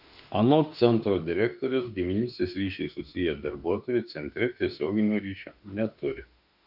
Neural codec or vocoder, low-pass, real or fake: autoencoder, 48 kHz, 32 numbers a frame, DAC-VAE, trained on Japanese speech; 5.4 kHz; fake